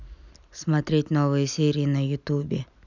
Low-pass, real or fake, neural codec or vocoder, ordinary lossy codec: 7.2 kHz; real; none; none